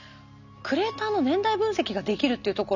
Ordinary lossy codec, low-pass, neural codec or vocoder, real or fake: none; 7.2 kHz; none; real